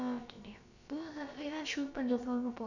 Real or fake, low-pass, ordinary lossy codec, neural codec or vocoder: fake; 7.2 kHz; none; codec, 16 kHz, about 1 kbps, DyCAST, with the encoder's durations